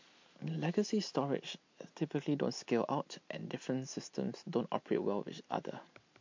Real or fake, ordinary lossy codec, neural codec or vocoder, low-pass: real; MP3, 48 kbps; none; 7.2 kHz